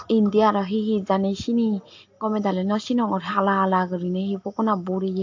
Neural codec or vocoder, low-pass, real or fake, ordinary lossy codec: none; 7.2 kHz; real; AAC, 48 kbps